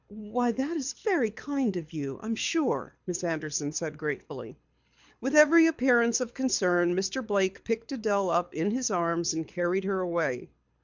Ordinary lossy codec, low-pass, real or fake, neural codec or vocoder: MP3, 64 kbps; 7.2 kHz; fake; codec, 24 kHz, 6 kbps, HILCodec